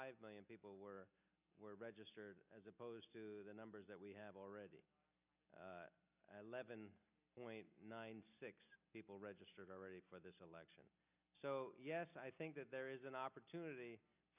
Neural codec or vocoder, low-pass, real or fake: none; 3.6 kHz; real